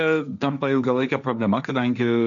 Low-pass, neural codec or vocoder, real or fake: 7.2 kHz; codec, 16 kHz, 1.1 kbps, Voila-Tokenizer; fake